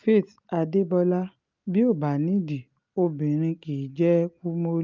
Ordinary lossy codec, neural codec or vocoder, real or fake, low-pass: Opus, 32 kbps; none; real; 7.2 kHz